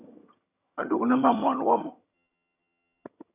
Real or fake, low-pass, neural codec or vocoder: fake; 3.6 kHz; vocoder, 22.05 kHz, 80 mel bands, HiFi-GAN